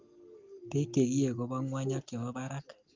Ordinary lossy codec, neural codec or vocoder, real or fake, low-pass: Opus, 24 kbps; none; real; 7.2 kHz